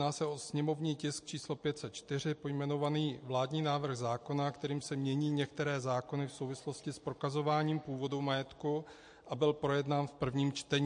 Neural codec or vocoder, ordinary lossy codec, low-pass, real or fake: none; MP3, 48 kbps; 9.9 kHz; real